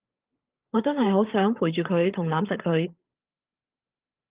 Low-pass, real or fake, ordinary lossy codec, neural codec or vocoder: 3.6 kHz; fake; Opus, 32 kbps; codec, 16 kHz, 8 kbps, FreqCodec, larger model